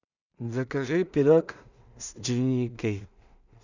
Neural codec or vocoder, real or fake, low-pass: codec, 16 kHz in and 24 kHz out, 0.4 kbps, LongCat-Audio-Codec, two codebook decoder; fake; 7.2 kHz